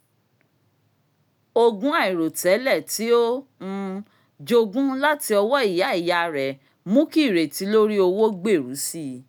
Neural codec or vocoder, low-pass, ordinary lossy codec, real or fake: none; none; none; real